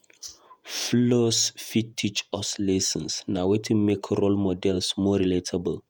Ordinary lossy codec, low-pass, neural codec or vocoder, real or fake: none; none; vocoder, 48 kHz, 128 mel bands, Vocos; fake